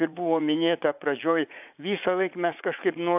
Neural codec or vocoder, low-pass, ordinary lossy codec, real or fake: vocoder, 44.1 kHz, 80 mel bands, Vocos; 3.6 kHz; AAC, 32 kbps; fake